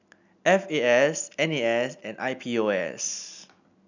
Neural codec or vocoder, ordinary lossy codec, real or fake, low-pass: none; none; real; 7.2 kHz